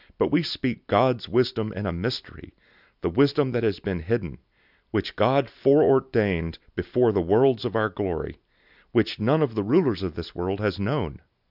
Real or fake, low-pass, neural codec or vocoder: real; 5.4 kHz; none